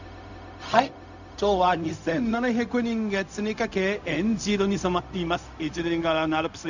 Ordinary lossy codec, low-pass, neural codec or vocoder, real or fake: none; 7.2 kHz; codec, 16 kHz, 0.4 kbps, LongCat-Audio-Codec; fake